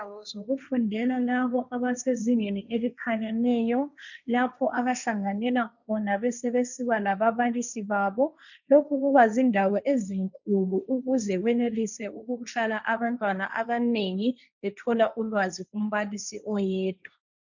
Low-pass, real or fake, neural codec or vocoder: 7.2 kHz; fake; codec, 16 kHz, 1.1 kbps, Voila-Tokenizer